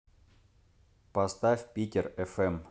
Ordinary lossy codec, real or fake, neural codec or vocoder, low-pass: none; real; none; none